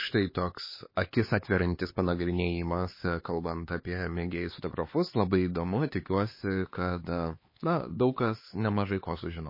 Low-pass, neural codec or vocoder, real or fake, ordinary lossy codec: 5.4 kHz; codec, 16 kHz, 4 kbps, X-Codec, HuBERT features, trained on LibriSpeech; fake; MP3, 24 kbps